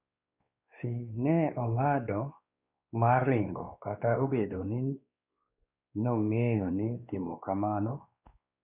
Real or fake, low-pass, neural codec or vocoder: fake; 3.6 kHz; codec, 16 kHz, 2 kbps, X-Codec, WavLM features, trained on Multilingual LibriSpeech